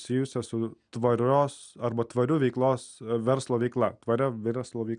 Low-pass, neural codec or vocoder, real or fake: 9.9 kHz; none; real